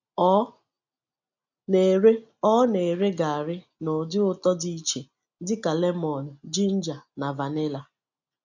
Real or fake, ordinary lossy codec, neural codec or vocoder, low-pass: real; none; none; 7.2 kHz